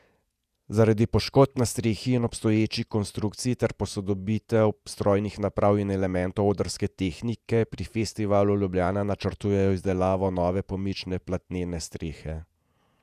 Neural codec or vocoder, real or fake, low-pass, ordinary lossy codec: vocoder, 48 kHz, 128 mel bands, Vocos; fake; 14.4 kHz; none